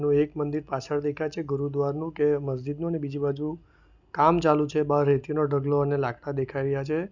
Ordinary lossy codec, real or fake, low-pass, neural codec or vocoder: none; real; 7.2 kHz; none